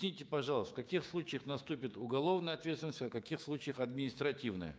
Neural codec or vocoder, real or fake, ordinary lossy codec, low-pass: codec, 16 kHz, 6 kbps, DAC; fake; none; none